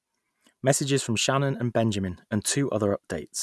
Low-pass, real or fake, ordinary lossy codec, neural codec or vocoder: none; real; none; none